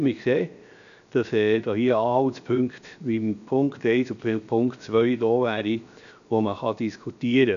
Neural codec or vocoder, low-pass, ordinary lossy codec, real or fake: codec, 16 kHz, 0.7 kbps, FocalCodec; 7.2 kHz; none; fake